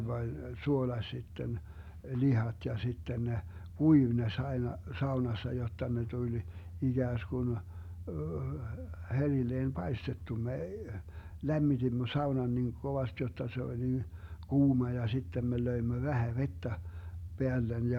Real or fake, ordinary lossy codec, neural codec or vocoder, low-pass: real; none; none; 19.8 kHz